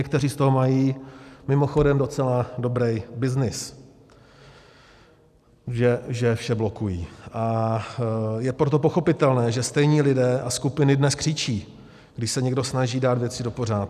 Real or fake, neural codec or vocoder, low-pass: real; none; 14.4 kHz